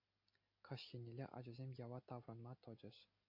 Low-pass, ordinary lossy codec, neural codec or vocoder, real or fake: 5.4 kHz; MP3, 32 kbps; none; real